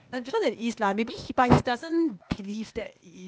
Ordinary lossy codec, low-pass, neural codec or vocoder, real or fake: none; none; codec, 16 kHz, 0.8 kbps, ZipCodec; fake